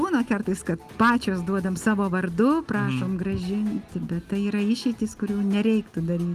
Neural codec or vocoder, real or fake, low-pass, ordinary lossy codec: none; real; 14.4 kHz; Opus, 24 kbps